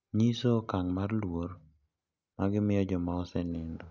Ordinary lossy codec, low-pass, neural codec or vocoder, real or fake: none; 7.2 kHz; none; real